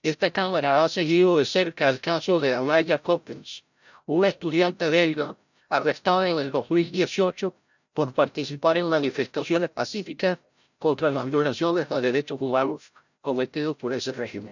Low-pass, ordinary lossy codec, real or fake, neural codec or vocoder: 7.2 kHz; none; fake; codec, 16 kHz, 0.5 kbps, FreqCodec, larger model